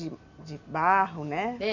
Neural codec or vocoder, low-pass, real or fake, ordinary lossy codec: none; 7.2 kHz; real; none